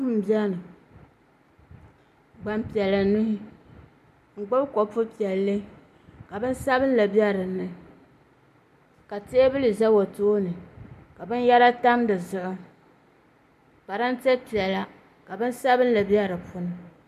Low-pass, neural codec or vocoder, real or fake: 14.4 kHz; none; real